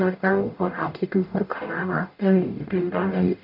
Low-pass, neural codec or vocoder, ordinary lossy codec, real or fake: 5.4 kHz; codec, 44.1 kHz, 0.9 kbps, DAC; none; fake